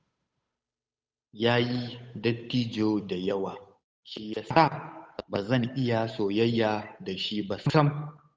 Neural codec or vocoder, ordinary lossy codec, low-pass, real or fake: codec, 16 kHz, 8 kbps, FunCodec, trained on Chinese and English, 25 frames a second; none; none; fake